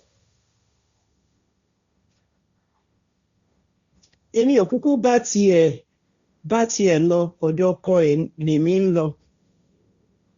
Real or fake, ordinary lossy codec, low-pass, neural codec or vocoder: fake; Opus, 64 kbps; 7.2 kHz; codec, 16 kHz, 1.1 kbps, Voila-Tokenizer